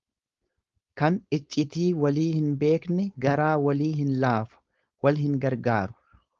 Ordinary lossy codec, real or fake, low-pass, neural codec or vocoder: Opus, 32 kbps; fake; 7.2 kHz; codec, 16 kHz, 4.8 kbps, FACodec